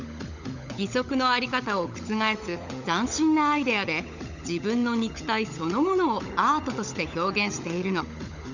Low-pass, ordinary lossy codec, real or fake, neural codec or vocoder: 7.2 kHz; none; fake; codec, 16 kHz, 16 kbps, FunCodec, trained on LibriTTS, 50 frames a second